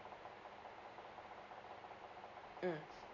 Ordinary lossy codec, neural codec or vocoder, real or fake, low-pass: none; none; real; 7.2 kHz